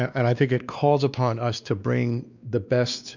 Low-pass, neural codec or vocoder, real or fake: 7.2 kHz; codec, 16 kHz, 2 kbps, X-Codec, WavLM features, trained on Multilingual LibriSpeech; fake